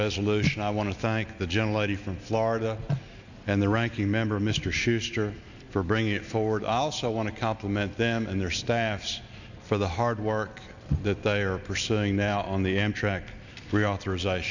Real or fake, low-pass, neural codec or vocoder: real; 7.2 kHz; none